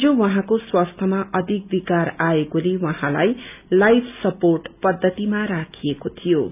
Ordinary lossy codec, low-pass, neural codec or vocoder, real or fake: none; 3.6 kHz; none; real